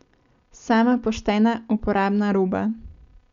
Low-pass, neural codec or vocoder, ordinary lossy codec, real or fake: 7.2 kHz; none; Opus, 64 kbps; real